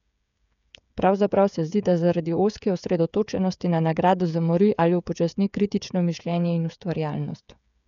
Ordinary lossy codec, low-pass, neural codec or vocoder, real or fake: none; 7.2 kHz; codec, 16 kHz, 16 kbps, FreqCodec, smaller model; fake